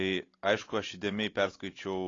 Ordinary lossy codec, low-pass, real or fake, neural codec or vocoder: AAC, 32 kbps; 7.2 kHz; real; none